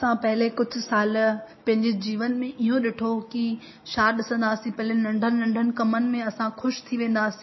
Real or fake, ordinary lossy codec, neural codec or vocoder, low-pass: real; MP3, 24 kbps; none; 7.2 kHz